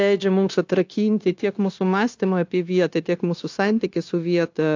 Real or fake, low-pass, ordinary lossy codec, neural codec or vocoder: fake; 7.2 kHz; AAC, 48 kbps; codec, 16 kHz, 0.9 kbps, LongCat-Audio-Codec